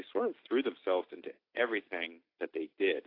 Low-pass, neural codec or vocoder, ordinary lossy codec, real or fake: 5.4 kHz; none; AAC, 32 kbps; real